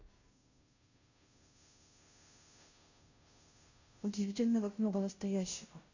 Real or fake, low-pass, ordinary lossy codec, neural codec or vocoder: fake; 7.2 kHz; none; codec, 16 kHz, 0.5 kbps, FunCodec, trained on Chinese and English, 25 frames a second